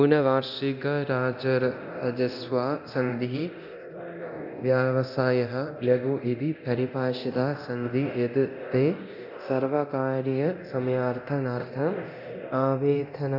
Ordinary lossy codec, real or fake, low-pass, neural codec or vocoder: none; fake; 5.4 kHz; codec, 24 kHz, 0.9 kbps, DualCodec